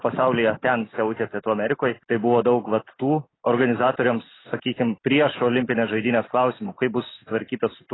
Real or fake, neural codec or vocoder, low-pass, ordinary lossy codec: real; none; 7.2 kHz; AAC, 16 kbps